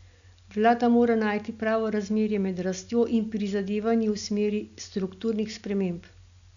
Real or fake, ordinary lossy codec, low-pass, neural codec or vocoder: real; none; 7.2 kHz; none